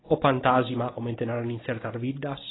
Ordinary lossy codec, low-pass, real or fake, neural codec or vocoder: AAC, 16 kbps; 7.2 kHz; real; none